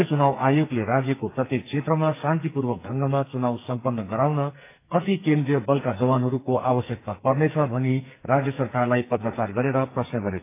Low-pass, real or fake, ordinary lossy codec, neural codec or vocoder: 3.6 kHz; fake; AAC, 24 kbps; codec, 44.1 kHz, 2.6 kbps, SNAC